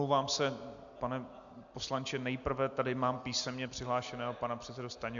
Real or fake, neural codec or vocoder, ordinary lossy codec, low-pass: real; none; MP3, 64 kbps; 7.2 kHz